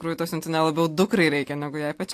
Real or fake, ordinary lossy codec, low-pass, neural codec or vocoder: real; AAC, 64 kbps; 14.4 kHz; none